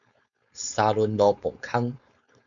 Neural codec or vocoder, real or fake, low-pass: codec, 16 kHz, 4.8 kbps, FACodec; fake; 7.2 kHz